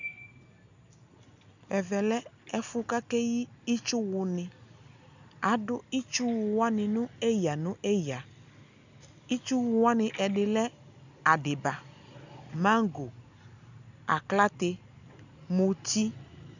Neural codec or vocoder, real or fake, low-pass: none; real; 7.2 kHz